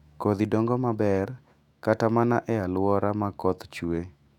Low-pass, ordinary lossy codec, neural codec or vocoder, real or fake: 19.8 kHz; none; autoencoder, 48 kHz, 128 numbers a frame, DAC-VAE, trained on Japanese speech; fake